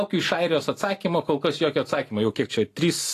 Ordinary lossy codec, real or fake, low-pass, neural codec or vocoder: AAC, 48 kbps; real; 14.4 kHz; none